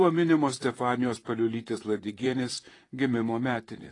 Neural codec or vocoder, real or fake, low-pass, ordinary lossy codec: vocoder, 44.1 kHz, 128 mel bands, Pupu-Vocoder; fake; 10.8 kHz; AAC, 32 kbps